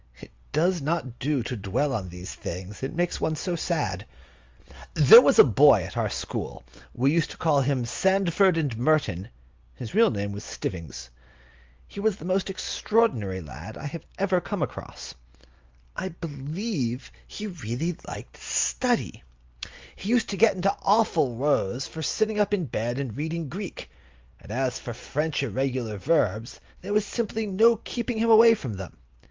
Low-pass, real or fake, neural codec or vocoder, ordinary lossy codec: 7.2 kHz; real; none; Opus, 32 kbps